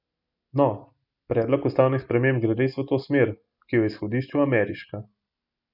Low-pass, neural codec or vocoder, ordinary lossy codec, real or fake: 5.4 kHz; none; none; real